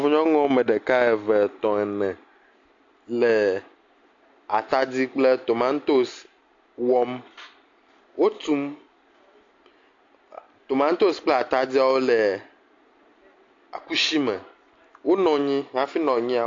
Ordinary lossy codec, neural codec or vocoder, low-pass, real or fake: AAC, 48 kbps; none; 7.2 kHz; real